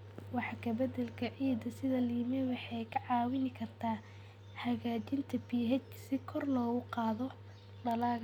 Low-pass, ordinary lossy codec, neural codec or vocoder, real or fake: 19.8 kHz; none; none; real